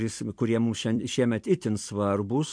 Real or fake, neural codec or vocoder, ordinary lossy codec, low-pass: fake; vocoder, 44.1 kHz, 128 mel bands every 256 samples, BigVGAN v2; MP3, 64 kbps; 9.9 kHz